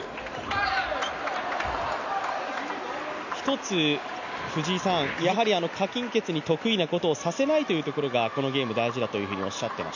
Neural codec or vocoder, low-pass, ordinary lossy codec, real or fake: none; 7.2 kHz; none; real